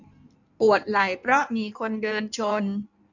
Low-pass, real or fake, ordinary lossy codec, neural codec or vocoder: 7.2 kHz; fake; none; codec, 16 kHz in and 24 kHz out, 1.1 kbps, FireRedTTS-2 codec